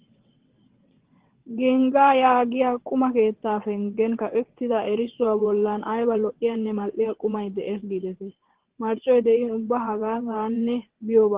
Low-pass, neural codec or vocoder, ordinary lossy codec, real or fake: 3.6 kHz; vocoder, 22.05 kHz, 80 mel bands, WaveNeXt; Opus, 16 kbps; fake